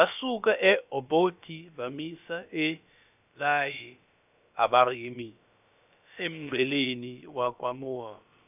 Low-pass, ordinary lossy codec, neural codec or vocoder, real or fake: 3.6 kHz; none; codec, 16 kHz, about 1 kbps, DyCAST, with the encoder's durations; fake